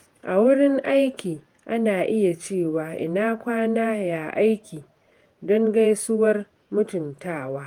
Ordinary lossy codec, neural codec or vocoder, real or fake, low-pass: Opus, 32 kbps; vocoder, 48 kHz, 128 mel bands, Vocos; fake; 19.8 kHz